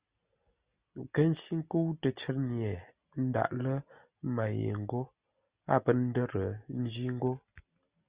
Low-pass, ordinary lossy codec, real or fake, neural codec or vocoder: 3.6 kHz; Opus, 64 kbps; real; none